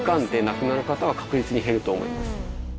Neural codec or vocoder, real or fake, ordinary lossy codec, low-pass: none; real; none; none